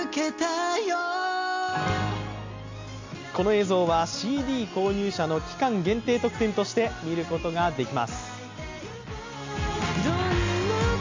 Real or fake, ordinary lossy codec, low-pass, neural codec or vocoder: real; MP3, 48 kbps; 7.2 kHz; none